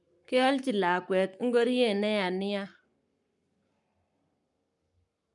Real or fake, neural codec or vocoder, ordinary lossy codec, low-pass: fake; codec, 44.1 kHz, 7.8 kbps, Pupu-Codec; none; 10.8 kHz